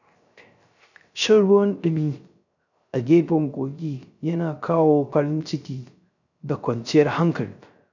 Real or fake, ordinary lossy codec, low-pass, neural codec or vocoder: fake; none; 7.2 kHz; codec, 16 kHz, 0.3 kbps, FocalCodec